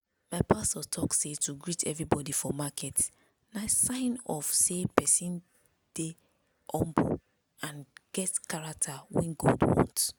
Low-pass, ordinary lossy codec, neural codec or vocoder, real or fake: none; none; none; real